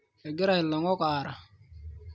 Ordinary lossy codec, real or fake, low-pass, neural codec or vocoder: none; real; none; none